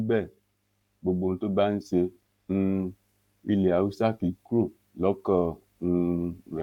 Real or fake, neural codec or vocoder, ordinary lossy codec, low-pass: fake; codec, 44.1 kHz, 7.8 kbps, Pupu-Codec; none; 19.8 kHz